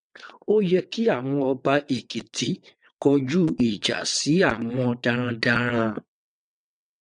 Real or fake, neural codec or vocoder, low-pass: fake; vocoder, 22.05 kHz, 80 mel bands, WaveNeXt; 9.9 kHz